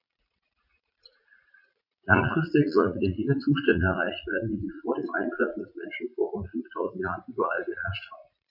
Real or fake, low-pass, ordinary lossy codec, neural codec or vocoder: fake; 5.4 kHz; none; vocoder, 44.1 kHz, 80 mel bands, Vocos